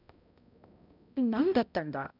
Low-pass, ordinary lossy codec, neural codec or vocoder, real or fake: 5.4 kHz; none; codec, 16 kHz, 0.5 kbps, X-Codec, HuBERT features, trained on balanced general audio; fake